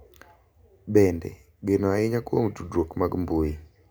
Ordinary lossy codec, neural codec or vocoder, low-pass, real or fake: none; none; none; real